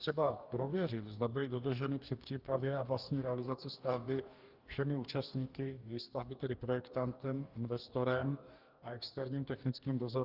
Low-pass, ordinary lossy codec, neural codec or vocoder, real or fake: 5.4 kHz; Opus, 32 kbps; codec, 44.1 kHz, 2.6 kbps, DAC; fake